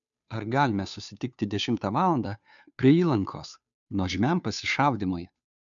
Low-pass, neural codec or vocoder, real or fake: 7.2 kHz; codec, 16 kHz, 2 kbps, FunCodec, trained on Chinese and English, 25 frames a second; fake